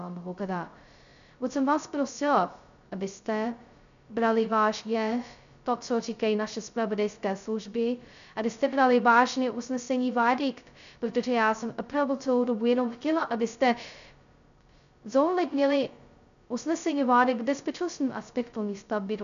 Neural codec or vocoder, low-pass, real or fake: codec, 16 kHz, 0.2 kbps, FocalCodec; 7.2 kHz; fake